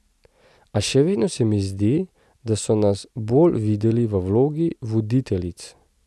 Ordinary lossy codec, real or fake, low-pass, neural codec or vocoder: none; real; none; none